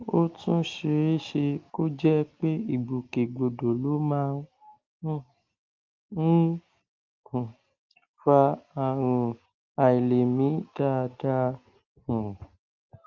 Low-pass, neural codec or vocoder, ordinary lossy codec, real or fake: 7.2 kHz; none; Opus, 24 kbps; real